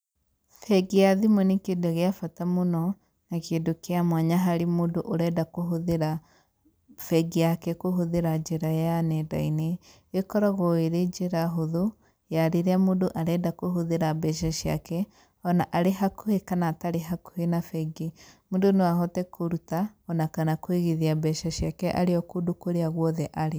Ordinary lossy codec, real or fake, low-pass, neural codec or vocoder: none; real; none; none